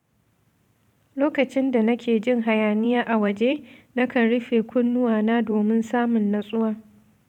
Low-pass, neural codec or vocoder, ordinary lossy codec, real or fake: 19.8 kHz; vocoder, 44.1 kHz, 128 mel bands every 512 samples, BigVGAN v2; none; fake